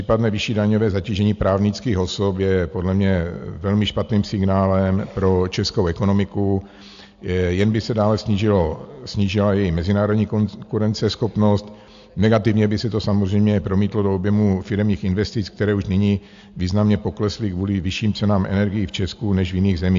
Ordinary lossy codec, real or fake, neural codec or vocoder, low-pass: AAC, 64 kbps; real; none; 7.2 kHz